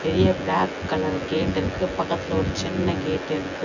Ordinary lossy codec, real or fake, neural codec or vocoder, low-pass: none; fake; vocoder, 24 kHz, 100 mel bands, Vocos; 7.2 kHz